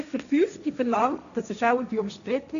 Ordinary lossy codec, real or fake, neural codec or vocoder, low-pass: none; fake; codec, 16 kHz, 1.1 kbps, Voila-Tokenizer; 7.2 kHz